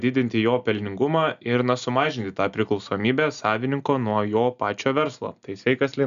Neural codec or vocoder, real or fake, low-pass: none; real; 7.2 kHz